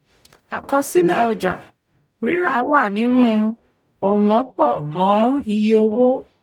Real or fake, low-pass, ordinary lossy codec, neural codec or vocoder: fake; 19.8 kHz; none; codec, 44.1 kHz, 0.9 kbps, DAC